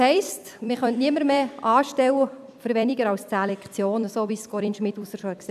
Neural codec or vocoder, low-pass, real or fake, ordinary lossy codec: vocoder, 44.1 kHz, 128 mel bands every 256 samples, BigVGAN v2; 14.4 kHz; fake; none